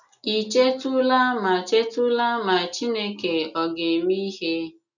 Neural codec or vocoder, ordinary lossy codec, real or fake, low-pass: none; none; real; 7.2 kHz